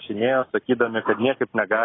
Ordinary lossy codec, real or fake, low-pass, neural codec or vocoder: AAC, 16 kbps; real; 7.2 kHz; none